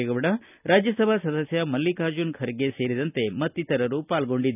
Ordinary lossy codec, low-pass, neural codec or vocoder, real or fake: none; 3.6 kHz; none; real